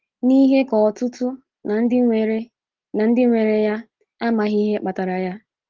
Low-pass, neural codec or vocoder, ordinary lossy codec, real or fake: 7.2 kHz; none; Opus, 16 kbps; real